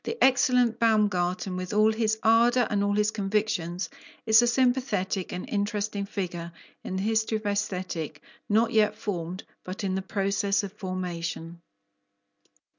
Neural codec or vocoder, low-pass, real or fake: none; 7.2 kHz; real